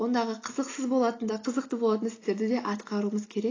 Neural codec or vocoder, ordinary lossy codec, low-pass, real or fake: none; AAC, 32 kbps; 7.2 kHz; real